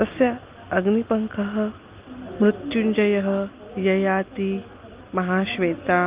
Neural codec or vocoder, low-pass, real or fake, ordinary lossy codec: none; 3.6 kHz; real; Opus, 32 kbps